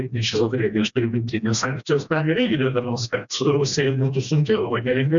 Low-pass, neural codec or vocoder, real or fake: 7.2 kHz; codec, 16 kHz, 1 kbps, FreqCodec, smaller model; fake